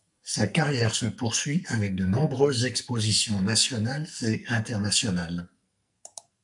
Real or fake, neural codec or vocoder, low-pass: fake; codec, 44.1 kHz, 2.6 kbps, SNAC; 10.8 kHz